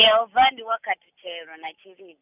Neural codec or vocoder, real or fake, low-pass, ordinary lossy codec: none; real; 3.6 kHz; none